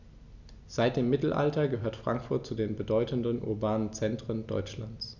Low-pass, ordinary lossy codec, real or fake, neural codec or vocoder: 7.2 kHz; none; real; none